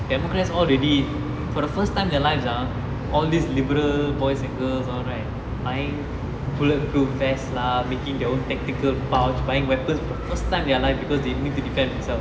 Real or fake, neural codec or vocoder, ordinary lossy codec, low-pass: real; none; none; none